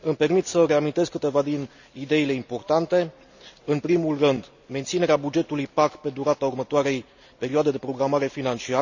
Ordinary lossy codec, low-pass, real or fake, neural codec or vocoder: none; 7.2 kHz; real; none